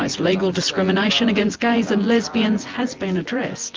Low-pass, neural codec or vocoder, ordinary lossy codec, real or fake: 7.2 kHz; vocoder, 24 kHz, 100 mel bands, Vocos; Opus, 32 kbps; fake